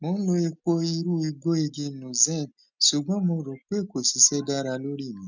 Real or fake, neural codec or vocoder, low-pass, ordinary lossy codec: real; none; 7.2 kHz; none